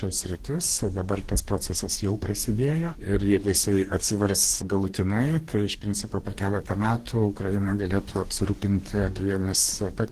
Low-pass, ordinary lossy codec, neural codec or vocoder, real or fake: 14.4 kHz; Opus, 16 kbps; codec, 44.1 kHz, 2.6 kbps, DAC; fake